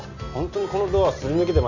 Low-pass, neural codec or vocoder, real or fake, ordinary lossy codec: 7.2 kHz; none; real; none